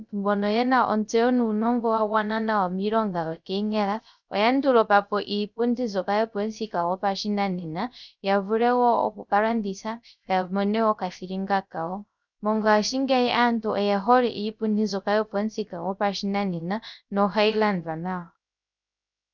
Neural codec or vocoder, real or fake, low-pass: codec, 16 kHz, 0.3 kbps, FocalCodec; fake; 7.2 kHz